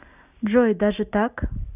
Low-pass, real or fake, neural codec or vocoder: 3.6 kHz; real; none